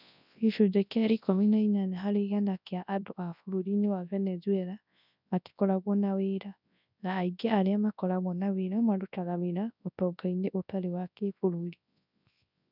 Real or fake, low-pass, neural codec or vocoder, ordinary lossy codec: fake; 5.4 kHz; codec, 24 kHz, 0.9 kbps, WavTokenizer, large speech release; none